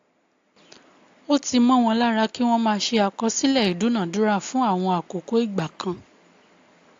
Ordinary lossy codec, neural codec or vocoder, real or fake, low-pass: AAC, 48 kbps; none; real; 7.2 kHz